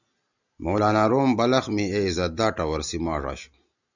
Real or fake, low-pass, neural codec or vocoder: real; 7.2 kHz; none